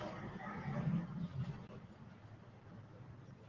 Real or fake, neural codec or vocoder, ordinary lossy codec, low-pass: real; none; Opus, 16 kbps; 7.2 kHz